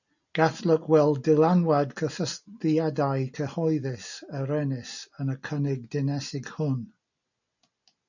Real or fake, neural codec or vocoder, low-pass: real; none; 7.2 kHz